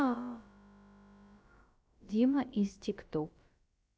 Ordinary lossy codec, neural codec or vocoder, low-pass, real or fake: none; codec, 16 kHz, about 1 kbps, DyCAST, with the encoder's durations; none; fake